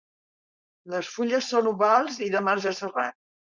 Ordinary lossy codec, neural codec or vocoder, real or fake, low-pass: Opus, 64 kbps; codec, 16 kHz, 4.8 kbps, FACodec; fake; 7.2 kHz